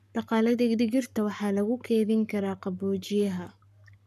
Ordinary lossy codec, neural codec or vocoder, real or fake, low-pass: none; codec, 44.1 kHz, 7.8 kbps, Pupu-Codec; fake; 14.4 kHz